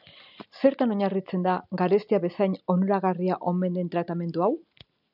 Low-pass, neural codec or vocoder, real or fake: 5.4 kHz; none; real